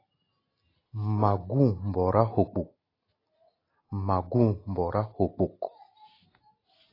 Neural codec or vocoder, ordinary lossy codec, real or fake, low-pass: none; AAC, 24 kbps; real; 5.4 kHz